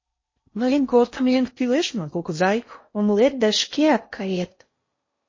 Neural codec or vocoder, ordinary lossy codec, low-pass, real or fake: codec, 16 kHz in and 24 kHz out, 0.6 kbps, FocalCodec, streaming, 4096 codes; MP3, 32 kbps; 7.2 kHz; fake